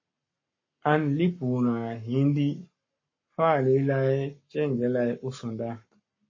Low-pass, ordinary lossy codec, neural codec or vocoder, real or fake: 7.2 kHz; MP3, 32 kbps; codec, 44.1 kHz, 7.8 kbps, Pupu-Codec; fake